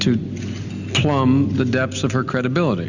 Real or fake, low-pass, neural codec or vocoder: real; 7.2 kHz; none